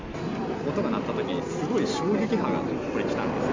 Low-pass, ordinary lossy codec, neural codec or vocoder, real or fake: 7.2 kHz; MP3, 48 kbps; none; real